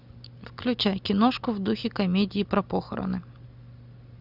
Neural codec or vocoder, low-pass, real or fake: none; 5.4 kHz; real